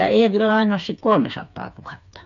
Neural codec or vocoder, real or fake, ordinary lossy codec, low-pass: codec, 16 kHz, 4 kbps, FreqCodec, smaller model; fake; none; 7.2 kHz